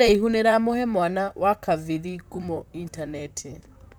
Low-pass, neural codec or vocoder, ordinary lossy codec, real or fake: none; vocoder, 44.1 kHz, 128 mel bands, Pupu-Vocoder; none; fake